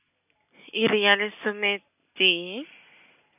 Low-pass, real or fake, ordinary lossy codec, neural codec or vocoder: 3.6 kHz; real; none; none